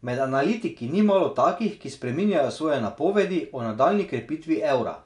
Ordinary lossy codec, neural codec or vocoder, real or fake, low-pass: none; none; real; 10.8 kHz